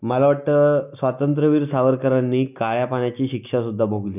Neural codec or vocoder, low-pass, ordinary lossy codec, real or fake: none; 3.6 kHz; none; real